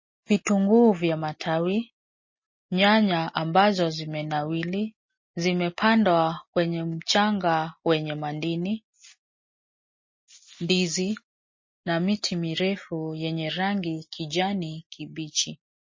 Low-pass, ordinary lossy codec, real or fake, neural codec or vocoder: 7.2 kHz; MP3, 32 kbps; real; none